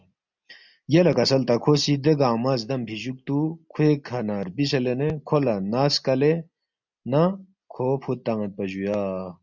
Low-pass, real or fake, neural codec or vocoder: 7.2 kHz; real; none